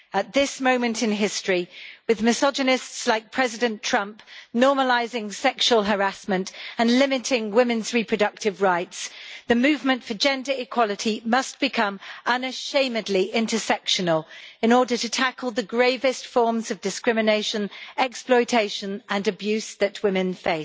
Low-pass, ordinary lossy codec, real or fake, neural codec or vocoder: none; none; real; none